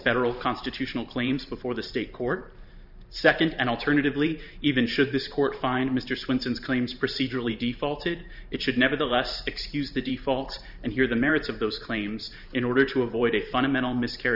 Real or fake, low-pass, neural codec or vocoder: fake; 5.4 kHz; vocoder, 44.1 kHz, 128 mel bands every 512 samples, BigVGAN v2